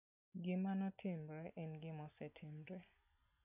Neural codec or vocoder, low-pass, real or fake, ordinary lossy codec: none; 3.6 kHz; real; none